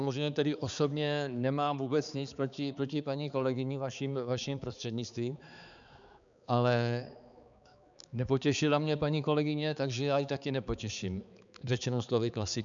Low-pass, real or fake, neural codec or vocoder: 7.2 kHz; fake; codec, 16 kHz, 4 kbps, X-Codec, HuBERT features, trained on balanced general audio